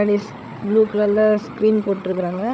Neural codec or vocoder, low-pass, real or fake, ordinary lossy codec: codec, 16 kHz, 16 kbps, FreqCodec, larger model; none; fake; none